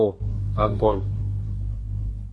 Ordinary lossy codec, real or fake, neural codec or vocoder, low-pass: MP3, 48 kbps; fake; codec, 24 kHz, 1 kbps, SNAC; 10.8 kHz